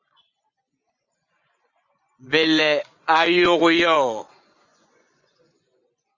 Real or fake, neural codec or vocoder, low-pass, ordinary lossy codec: fake; vocoder, 24 kHz, 100 mel bands, Vocos; 7.2 kHz; Opus, 64 kbps